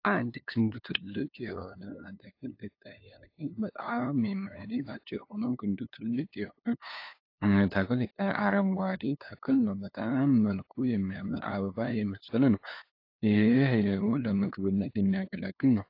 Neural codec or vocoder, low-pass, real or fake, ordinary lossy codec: codec, 16 kHz, 2 kbps, FunCodec, trained on LibriTTS, 25 frames a second; 5.4 kHz; fake; AAC, 32 kbps